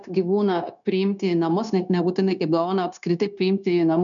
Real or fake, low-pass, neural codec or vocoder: fake; 7.2 kHz; codec, 16 kHz, 0.9 kbps, LongCat-Audio-Codec